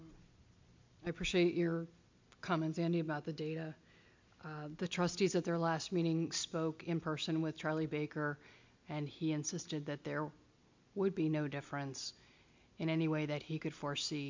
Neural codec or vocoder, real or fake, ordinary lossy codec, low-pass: none; real; MP3, 64 kbps; 7.2 kHz